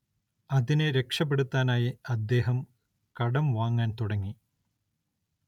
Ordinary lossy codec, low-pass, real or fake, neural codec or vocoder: none; 19.8 kHz; real; none